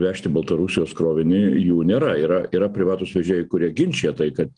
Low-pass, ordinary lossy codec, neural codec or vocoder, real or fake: 9.9 kHz; Opus, 24 kbps; none; real